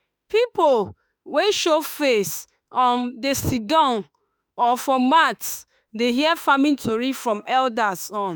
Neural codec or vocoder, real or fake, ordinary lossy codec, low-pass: autoencoder, 48 kHz, 32 numbers a frame, DAC-VAE, trained on Japanese speech; fake; none; none